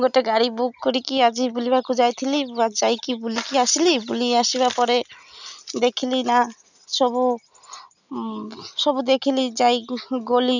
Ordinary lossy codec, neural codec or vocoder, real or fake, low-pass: none; none; real; 7.2 kHz